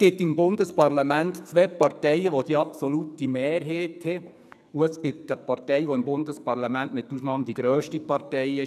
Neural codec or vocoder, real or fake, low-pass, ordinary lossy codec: codec, 44.1 kHz, 2.6 kbps, SNAC; fake; 14.4 kHz; none